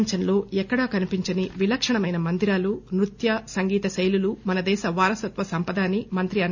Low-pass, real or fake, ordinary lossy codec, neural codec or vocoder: 7.2 kHz; real; none; none